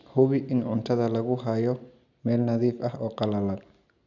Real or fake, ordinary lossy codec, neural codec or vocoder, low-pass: real; none; none; 7.2 kHz